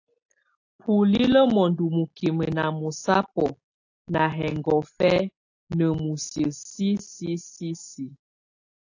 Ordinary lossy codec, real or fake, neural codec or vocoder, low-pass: AAC, 48 kbps; real; none; 7.2 kHz